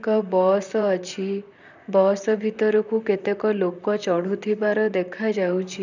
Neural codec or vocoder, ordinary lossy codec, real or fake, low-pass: vocoder, 44.1 kHz, 128 mel bands, Pupu-Vocoder; none; fake; 7.2 kHz